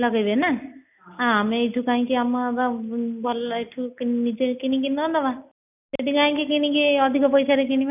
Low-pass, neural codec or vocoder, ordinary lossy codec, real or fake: 3.6 kHz; none; none; real